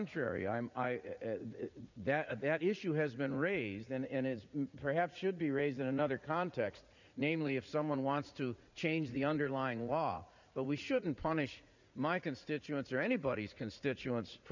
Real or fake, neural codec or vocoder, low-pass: fake; vocoder, 44.1 kHz, 80 mel bands, Vocos; 7.2 kHz